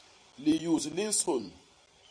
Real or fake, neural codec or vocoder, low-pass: fake; vocoder, 44.1 kHz, 128 mel bands every 256 samples, BigVGAN v2; 9.9 kHz